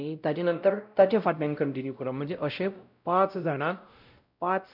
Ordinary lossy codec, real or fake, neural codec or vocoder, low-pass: none; fake; codec, 16 kHz, 0.5 kbps, X-Codec, WavLM features, trained on Multilingual LibriSpeech; 5.4 kHz